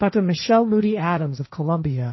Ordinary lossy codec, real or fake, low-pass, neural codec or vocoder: MP3, 24 kbps; fake; 7.2 kHz; codec, 16 kHz, 1.1 kbps, Voila-Tokenizer